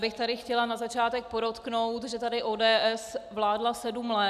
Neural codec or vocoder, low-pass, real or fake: none; 14.4 kHz; real